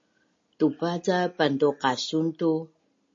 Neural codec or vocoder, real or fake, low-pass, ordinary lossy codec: none; real; 7.2 kHz; MP3, 32 kbps